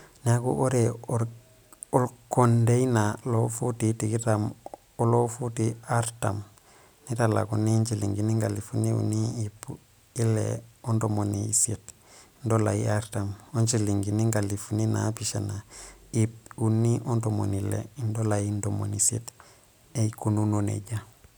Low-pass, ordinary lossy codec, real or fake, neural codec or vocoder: none; none; real; none